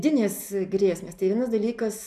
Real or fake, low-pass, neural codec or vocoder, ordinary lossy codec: real; 14.4 kHz; none; AAC, 96 kbps